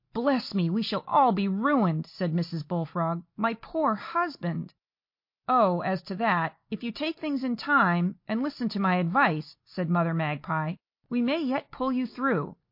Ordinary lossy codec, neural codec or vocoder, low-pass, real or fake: MP3, 32 kbps; none; 5.4 kHz; real